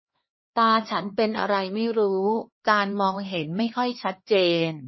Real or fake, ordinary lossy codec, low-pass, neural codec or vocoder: fake; MP3, 24 kbps; 7.2 kHz; codec, 16 kHz, 2 kbps, X-Codec, HuBERT features, trained on LibriSpeech